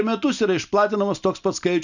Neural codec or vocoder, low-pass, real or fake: none; 7.2 kHz; real